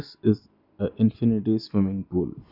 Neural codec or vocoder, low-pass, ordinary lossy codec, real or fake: none; 5.4 kHz; none; real